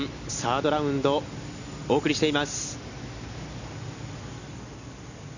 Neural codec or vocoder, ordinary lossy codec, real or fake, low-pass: none; none; real; 7.2 kHz